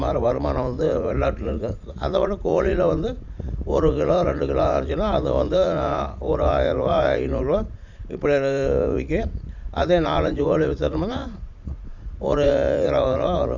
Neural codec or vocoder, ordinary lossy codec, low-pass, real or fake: vocoder, 44.1 kHz, 80 mel bands, Vocos; none; 7.2 kHz; fake